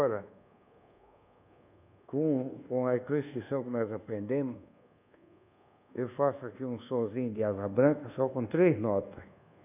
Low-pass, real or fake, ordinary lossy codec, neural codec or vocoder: 3.6 kHz; fake; none; codec, 24 kHz, 1.2 kbps, DualCodec